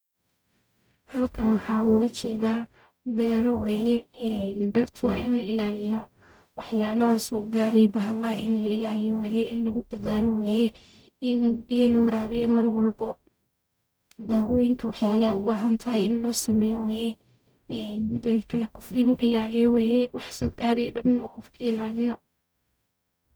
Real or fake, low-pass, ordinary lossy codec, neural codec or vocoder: fake; none; none; codec, 44.1 kHz, 0.9 kbps, DAC